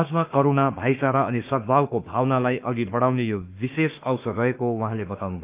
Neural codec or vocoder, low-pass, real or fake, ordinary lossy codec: autoencoder, 48 kHz, 32 numbers a frame, DAC-VAE, trained on Japanese speech; 3.6 kHz; fake; Opus, 24 kbps